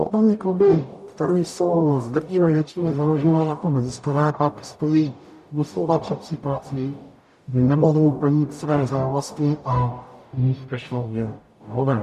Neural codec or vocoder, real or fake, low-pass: codec, 44.1 kHz, 0.9 kbps, DAC; fake; 14.4 kHz